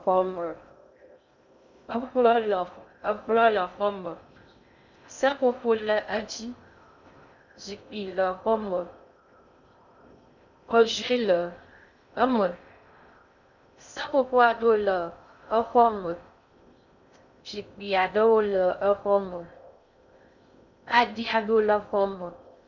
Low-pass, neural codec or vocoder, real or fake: 7.2 kHz; codec, 16 kHz in and 24 kHz out, 0.6 kbps, FocalCodec, streaming, 2048 codes; fake